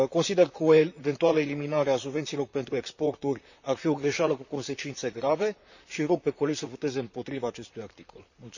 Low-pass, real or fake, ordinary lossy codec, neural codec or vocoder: 7.2 kHz; fake; none; vocoder, 44.1 kHz, 128 mel bands, Pupu-Vocoder